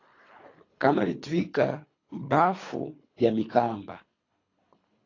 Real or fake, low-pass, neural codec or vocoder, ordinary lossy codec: fake; 7.2 kHz; codec, 24 kHz, 3 kbps, HILCodec; AAC, 32 kbps